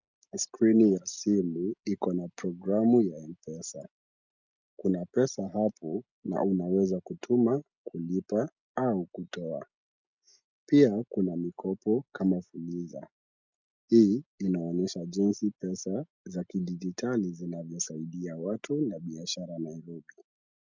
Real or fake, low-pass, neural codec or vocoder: real; 7.2 kHz; none